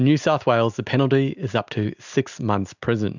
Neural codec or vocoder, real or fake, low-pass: none; real; 7.2 kHz